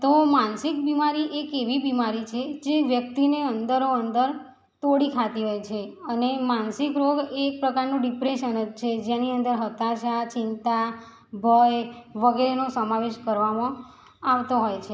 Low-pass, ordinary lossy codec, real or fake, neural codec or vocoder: none; none; real; none